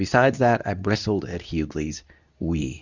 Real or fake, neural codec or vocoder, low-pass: fake; codec, 24 kHz, 0.9 kbps, WavTokenizer, medium speech release version 2; 7.2 kHz